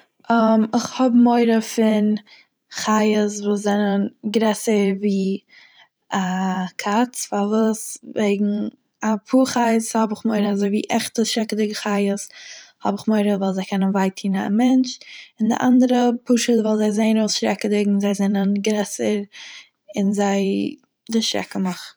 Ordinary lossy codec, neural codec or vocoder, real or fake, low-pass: none; vocoder, 44.1 kHz, 128 mel bands every 512 samples, BigVGAN v2; fake; none